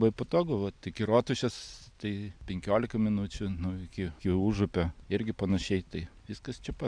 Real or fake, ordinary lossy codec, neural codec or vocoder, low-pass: real; MP3, 96 kbps; none; 9.9 kHz